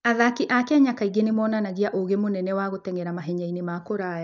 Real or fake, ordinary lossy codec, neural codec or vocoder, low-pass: real; none; none; 7.2 kHz